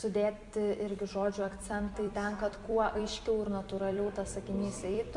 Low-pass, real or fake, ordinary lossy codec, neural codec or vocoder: 10.8 kHz; fake; MP3, 64 kbps; vocoder, 44.1 kHz, 128 mel bands every 256 samples, BigVGAN v2